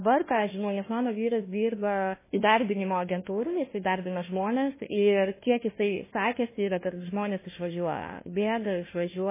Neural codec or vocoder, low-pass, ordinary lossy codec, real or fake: codec, 16 kHz, 1 kbps, FunCodec, trained on Chinese and English, 50 frames a second; 3.6 kHz; MP3, 16 kbps; fake